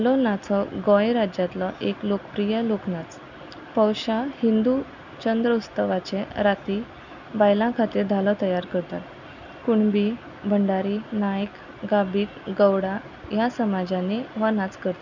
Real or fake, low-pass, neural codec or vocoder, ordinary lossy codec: real; 7.2 kHz; none; none